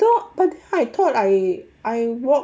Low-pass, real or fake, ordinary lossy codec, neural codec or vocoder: none; real; none; none